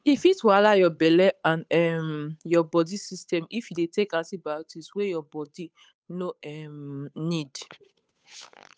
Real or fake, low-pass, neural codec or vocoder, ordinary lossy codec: fake; none; codec, 16 kHz, 8 kbps, FunCodec, trained on Chinese and English, 25 frames a second; none